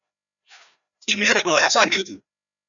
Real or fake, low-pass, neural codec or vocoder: fake; 7.2 kHz; codec, 16 kHz, 1 kbps, FreqCodec, larger model